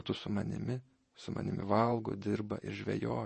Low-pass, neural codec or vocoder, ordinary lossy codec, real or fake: 10.8 kHz; vocoder, 48 kHz, 128 mel bands, Vocos; MP3, 32 kbps; fake